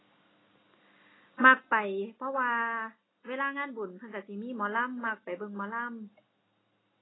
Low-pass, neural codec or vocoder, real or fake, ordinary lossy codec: 7.2 kHz; none; real; AAC, 16 kbps